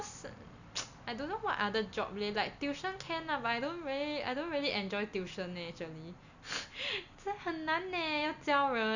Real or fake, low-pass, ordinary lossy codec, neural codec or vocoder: real; 7.2 kHz; none; none